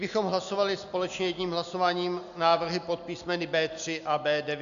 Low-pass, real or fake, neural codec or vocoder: 7.2 kHz; real; none